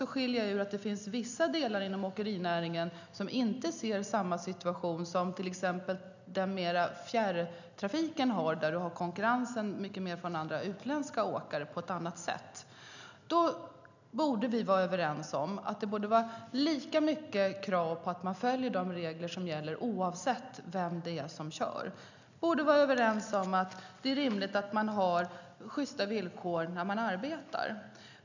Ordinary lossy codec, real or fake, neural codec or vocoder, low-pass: none; real; none; 7.2 kHz